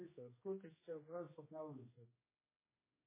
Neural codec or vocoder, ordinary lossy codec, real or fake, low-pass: codec, 16 kHz, 1 kbps, X-Codec, HuBERT features, trained on general audio; MP3, 16 kbps; fake; 3.6 kHz